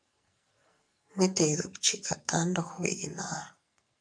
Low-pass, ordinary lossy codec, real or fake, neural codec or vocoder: 9.9 kHz; MP3, 96 kbps; fake; codec, 44.1 kHz, 2.6 kbps, SNAC